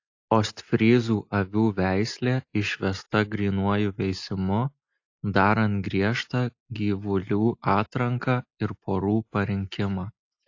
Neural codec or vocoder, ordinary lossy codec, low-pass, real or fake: none; AAC, 48 kbps; 7.2 kHz; real